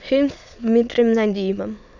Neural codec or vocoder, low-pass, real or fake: autoencoder, 22.05 kHz, a latent of 192 numbers a frame, VITS, trained on many speakers; 7.2 kHz; fake